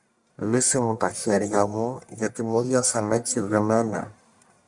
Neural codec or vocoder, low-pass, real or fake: codec, 44.1 kHz, 1.7 kbps, Pupu-Codec; 10.8 kHz; fake